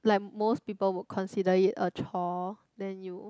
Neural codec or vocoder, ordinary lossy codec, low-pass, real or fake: none; none; none; real